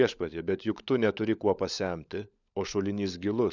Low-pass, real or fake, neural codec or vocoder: 7.2 kHz; fake; codec, 16 kHz, 16 kbps, FunCodec, trained on LibriTTS, 50 frames a second